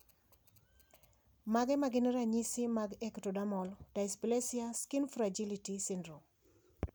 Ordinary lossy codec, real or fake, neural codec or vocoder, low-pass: none; real; none; none